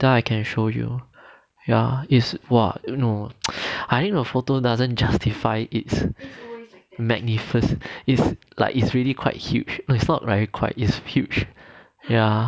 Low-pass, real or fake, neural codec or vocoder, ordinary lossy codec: none; real; none; none